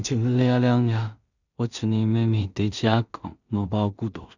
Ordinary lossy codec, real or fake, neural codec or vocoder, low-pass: none; fake; codec, 16 kHz in and 24 kHz out, 0.4 kbps, LongCat-Audio-Codec, two codebook decoder; 7.2 kHz